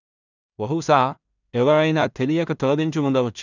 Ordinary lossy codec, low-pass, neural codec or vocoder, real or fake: none; 7.2 kHz; codec, 16 kHz in and 24 kHz out, 0.4 kbps, LongCat-Audio-Codec, two codebook decoder; fake